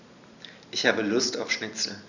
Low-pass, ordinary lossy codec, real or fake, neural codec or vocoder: 7.2 kHz; none; real; none